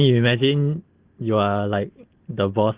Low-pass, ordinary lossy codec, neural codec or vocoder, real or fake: 3.6 kHz; Opus, 16 kbps; none; real